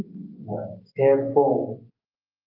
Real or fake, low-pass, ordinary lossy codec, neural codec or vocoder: real; 5.4 kHz; Opus, 24 kbps; none